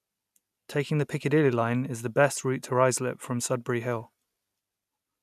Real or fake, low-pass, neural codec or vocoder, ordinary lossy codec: real; 14.4 kHz; none; none